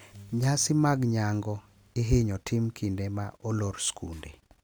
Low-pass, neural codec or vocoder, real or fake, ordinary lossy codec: none; none; real; none